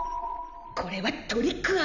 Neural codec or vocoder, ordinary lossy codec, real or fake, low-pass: none; none; real; 7.2 kHz